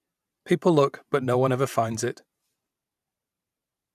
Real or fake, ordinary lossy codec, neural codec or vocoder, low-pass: fake; none; vocoder, 44.1 kHz, 128 mel bands every 512 samples, BigVGAN v2; 14.4 kHz